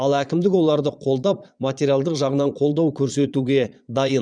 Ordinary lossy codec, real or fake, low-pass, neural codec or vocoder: none; fake; 9.9 kHz; vocoder, 22.05 kHz, 80 mel bands, Vocos